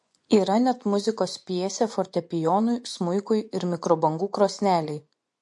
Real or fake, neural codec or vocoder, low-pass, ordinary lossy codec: fake; vocoder, 24 kHz, 100 mel bands, Vocos; 10.8 kHz; MP3, 48 kbps